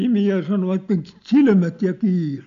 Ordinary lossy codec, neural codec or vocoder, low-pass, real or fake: MP3, 64 kbps; none; 7.2 kHz; real